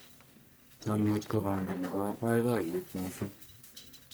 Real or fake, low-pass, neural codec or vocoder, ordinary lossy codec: fake; none; codec, 44.1 kHz, 1.7 kbps, Pupu-Codec; none